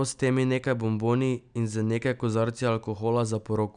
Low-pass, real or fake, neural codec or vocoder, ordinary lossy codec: 9.9 kHz; real; none; none